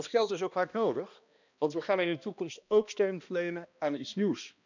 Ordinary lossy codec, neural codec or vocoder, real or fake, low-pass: none; codec, 16 kHz, 1 kbps, X-Codec, HuBERT features, trained on balanced general audio; fake; 7.2 kHz